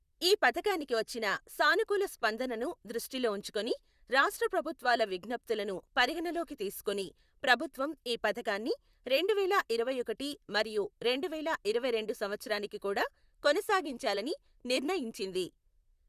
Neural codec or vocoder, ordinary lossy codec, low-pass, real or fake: vocoder, 44.1 kHz, 128 mel bands, Pupu-Vocoder; Opus, 64 kbps; 14.4 kHz; fake